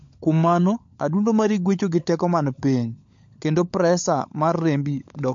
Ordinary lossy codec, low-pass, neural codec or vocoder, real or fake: MP3, 48 kbps; 7.2 kHz; codec, 16 kHz, 16 kbps, FunCodec, trained on LibriTTS, 50 frames a second; fake